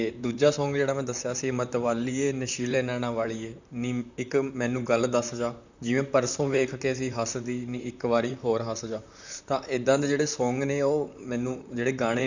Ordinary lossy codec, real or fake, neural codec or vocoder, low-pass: none; fake; vocoder, 44.1 kHz, 128 mel bands, Pupu-Vocoder; 7.2 kHz